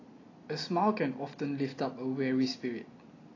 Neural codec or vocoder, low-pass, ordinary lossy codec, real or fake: none; 7.2 kHz; AAC, 32 kbps; real